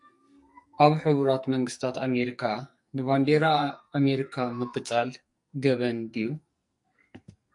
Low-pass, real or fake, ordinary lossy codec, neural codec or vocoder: 10.8 kHz; fake; MP3, 64 kbps; codec, 44.1 kHz, 2.6 kbps, SNAC